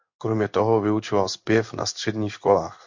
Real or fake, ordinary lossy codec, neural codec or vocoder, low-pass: fake; MP3, 48 kbps; codec, 16 kHz in and 24 kHz out, 1 kbps, XY-Tokenizer; 7.2 kHz